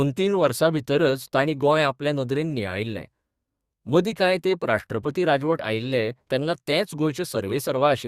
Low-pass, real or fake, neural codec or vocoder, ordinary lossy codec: 14.4 kHz; fake; codec, 32 kHz, 1.9 kbps, SNAC; Opus, 64 kbps